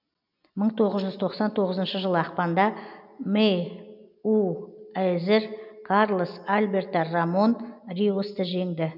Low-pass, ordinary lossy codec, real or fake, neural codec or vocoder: 5.4 kHz; none; real; none